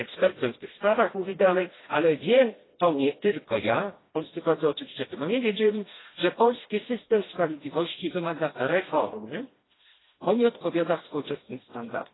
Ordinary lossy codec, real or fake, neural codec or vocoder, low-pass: AAC, 16 kbps; fake; codec, 16 kHz, 1 kbps, FreqCodec, smaller model; 7.2 kHz